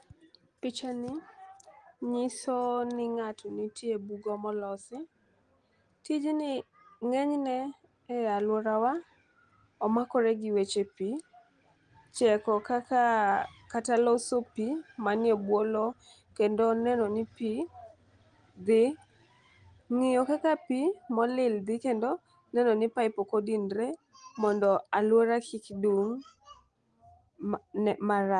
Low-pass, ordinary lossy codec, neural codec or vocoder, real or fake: 9.9 kHz; Opus, 24 kbps; none; real